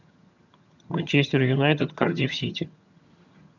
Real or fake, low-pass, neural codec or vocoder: fake; 7.2 kHz; vocoder, 22.05 kHz, 80 mel bands, HiFi-GAN